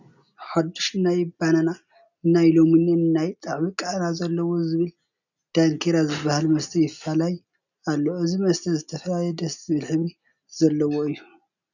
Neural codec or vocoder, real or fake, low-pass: none; real; 7.2 kHz